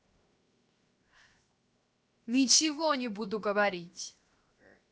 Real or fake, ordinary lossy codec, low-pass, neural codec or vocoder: fake; none; none; codec, 16 kHz, 0.7 kbps, FocalCodec